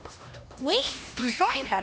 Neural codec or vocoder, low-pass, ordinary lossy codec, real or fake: codec, 16 kHz, 1 kbps, X-Codec, HuBERT features, trained on LibriSpeech; none; none; fake